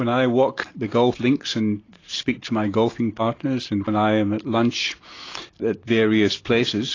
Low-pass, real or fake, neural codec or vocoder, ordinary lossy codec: 7.2 kHz; real; none; AAC, 32 kbps